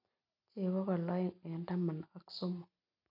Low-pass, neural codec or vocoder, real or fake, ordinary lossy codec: 5.4 kHz; none; real; none